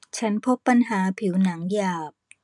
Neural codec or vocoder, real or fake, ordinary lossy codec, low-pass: vocoder, 44.1 kHz, 128 mel bands every 256 samples, BigVGAN v2; fake; none; 10.8 kHz